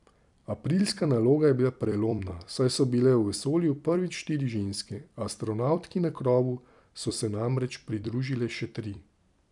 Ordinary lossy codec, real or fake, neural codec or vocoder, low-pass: none; fake; vocoder, 44.1 kHz, 128 mel bands every 256 samples, BigVGAN v2; 10.8 kHz